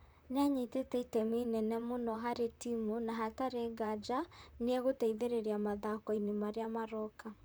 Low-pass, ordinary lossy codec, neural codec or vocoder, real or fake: none; none; vocoder, 44.1 kHz, 128 mel bands, Pupu-Vocoder; fake